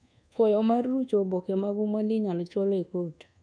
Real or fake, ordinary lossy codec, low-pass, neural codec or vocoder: fake; none; 9.9 kHz; codec, 24 kHz, 1.2 kbps, DualCodec